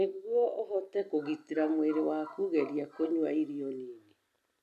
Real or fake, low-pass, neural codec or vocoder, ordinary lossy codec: real; 14.4 kHz; none; none